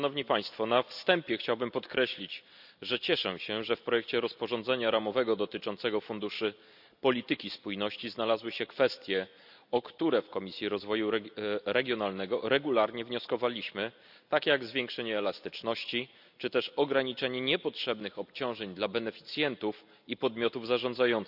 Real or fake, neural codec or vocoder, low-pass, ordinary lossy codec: real; none; 5.4 kHz; none